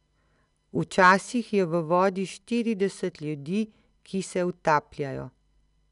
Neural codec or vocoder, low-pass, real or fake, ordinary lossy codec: none; 10.8 kHz; real; none